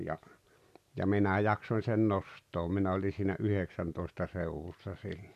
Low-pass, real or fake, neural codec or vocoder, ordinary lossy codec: 14.4 kHz; real; none; none